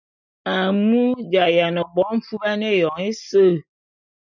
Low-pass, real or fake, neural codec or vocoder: 7.2 kHz; real; none